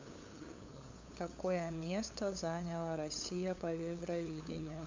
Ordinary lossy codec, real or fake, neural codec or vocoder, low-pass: MP3, 64 kbps; fake; codec, 16 kHz, 8 kbps, FunCodec, trained on LibriTTS, 25 frames a second; 7.2 kHz